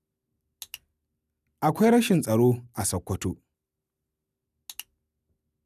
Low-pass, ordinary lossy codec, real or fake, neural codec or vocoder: 14.4 kHz; none; real; none